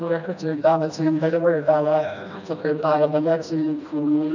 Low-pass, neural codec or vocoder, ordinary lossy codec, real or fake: 7.2 kHz; codec, 16 kHz, 1 kbps, FreqCodec, smaller model; none; fake